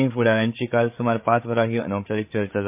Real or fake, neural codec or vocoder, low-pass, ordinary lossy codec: fake; codec, 16 kHz, 8 kbps, FreqCodec, larger model; 3.6 kHz; MP3, 32 kbps